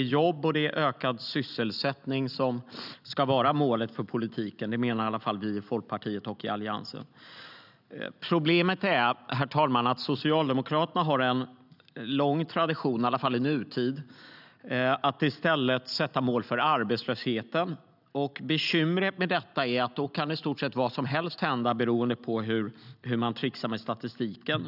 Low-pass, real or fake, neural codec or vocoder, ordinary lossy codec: 5.4 kHz; real; none; none